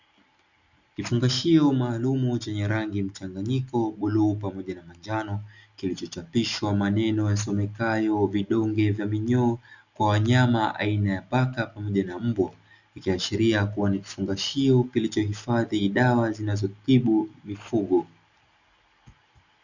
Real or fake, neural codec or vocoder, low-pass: real; none; 7.2 kHz